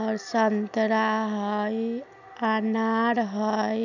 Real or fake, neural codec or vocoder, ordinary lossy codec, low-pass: real; none; none; 7.2 kHz